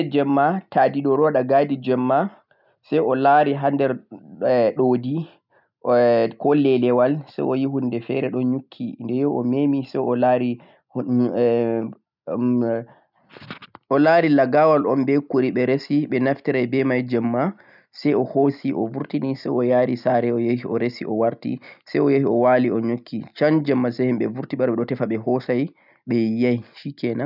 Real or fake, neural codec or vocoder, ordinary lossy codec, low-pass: real; none; none; 5.4 kHz